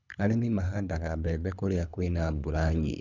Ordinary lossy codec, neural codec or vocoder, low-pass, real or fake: none; codec, 24 kHz, 3 kbps, HILCodec; 7.2 kHz; fake